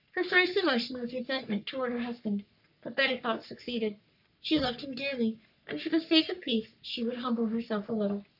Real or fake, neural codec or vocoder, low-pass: fake; codec, 44.1 kHz, 3.4 kbps, Pupu-Codec; 5.4 kHz